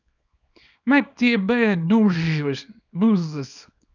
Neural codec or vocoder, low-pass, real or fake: codec, 24 kHz, 0.9 kbps, WavTokenizer, small release; 7.2 kHz; fake